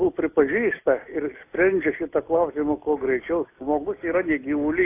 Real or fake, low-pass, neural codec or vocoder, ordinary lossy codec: real; 3.6 kHz; none; AAC, 24 kbps